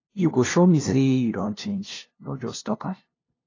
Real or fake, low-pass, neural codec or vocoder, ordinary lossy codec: fake; 7.2 kHz; codec, 16 kHz, 0.5 kbps, FunCodec, trained on LibriTTS, 25 frames a second; AAC, 32 kbps